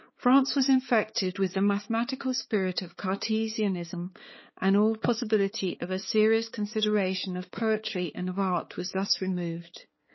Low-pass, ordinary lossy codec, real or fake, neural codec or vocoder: 7.2 kHz; MP3, 24 kbps; fake; codec, 16 kHz, 4 kbps, X-Codec, HuBERT features, trained on balanced general audio